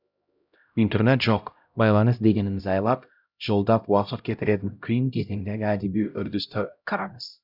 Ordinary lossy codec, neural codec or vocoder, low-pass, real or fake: none; codec, 16 kHz, 0.5 kbps, X-Codec, HuBERT features, trained on LibriSpeech; 5.4 kHz; fake